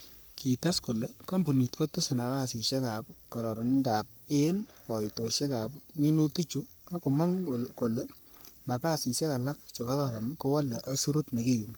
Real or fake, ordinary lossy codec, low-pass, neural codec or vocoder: fake; none; none; codec, 44.1 kHz, 3.4 kbps, Pupu-Codec